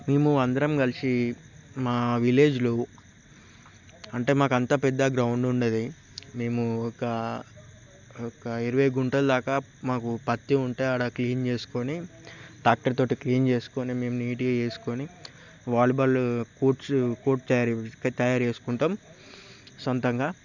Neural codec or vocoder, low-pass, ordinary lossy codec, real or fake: none; 7.2 kHz; none; real